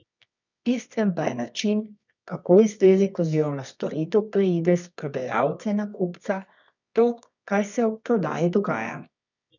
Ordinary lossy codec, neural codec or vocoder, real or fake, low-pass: none; codec, 24 kHz, 0.9 kbps, WavTokenizer, medium music audio release; fake; 7.2 kHz